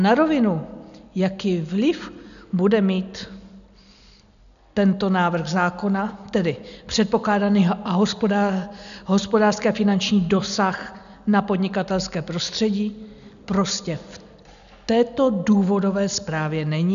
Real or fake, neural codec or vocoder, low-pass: real; none; 7.2 kHz